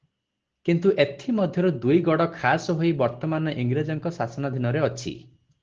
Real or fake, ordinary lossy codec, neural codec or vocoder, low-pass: real; Opus, 16 kbps; none; 7.2 kHz